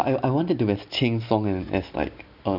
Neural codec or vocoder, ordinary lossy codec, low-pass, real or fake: none; none; 5.4 kHz; real